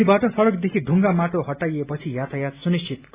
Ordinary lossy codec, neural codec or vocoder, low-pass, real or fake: Opus, 64 kbps; none; 3.6 kHz; real